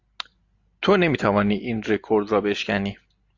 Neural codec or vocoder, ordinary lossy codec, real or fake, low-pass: none; AAC, 48 kbps; real; 7.2 kHz